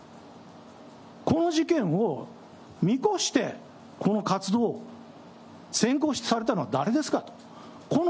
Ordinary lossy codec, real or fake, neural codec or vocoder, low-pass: none; real; none; none